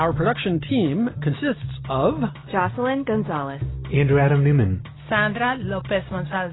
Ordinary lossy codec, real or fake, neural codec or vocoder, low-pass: AAC, 16 kbps; real; none; 7.2 kHz